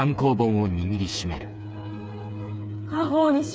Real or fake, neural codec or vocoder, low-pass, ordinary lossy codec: fake; codec, 16 kHz, 4 kbps, FreqCodec, smaller model; none; none